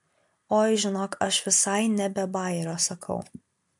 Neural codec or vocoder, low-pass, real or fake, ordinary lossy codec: none; 10.8 kHz; real; MP3, 64 kbps